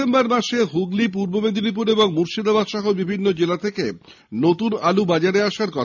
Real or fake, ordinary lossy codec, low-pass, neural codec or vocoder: real; none; 7.2 kHz; none